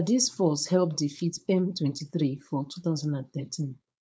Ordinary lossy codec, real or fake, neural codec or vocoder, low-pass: none; fake; codec, 16 kHz, 4.8 kbps, FACodec; none